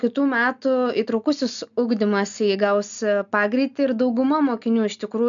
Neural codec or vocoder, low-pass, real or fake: none; 7.2 kHz; real